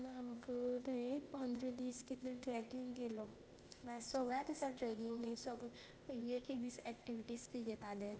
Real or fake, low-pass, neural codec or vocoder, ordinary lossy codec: fake; none; codec, 16 kHz, 0.8 kbps, ZipCodec; none